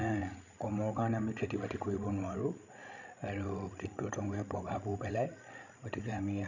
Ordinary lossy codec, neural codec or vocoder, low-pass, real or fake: none; codec, 16 kHz, 16 kbps, FreqCodec, larger model; 7.2 kHz; fake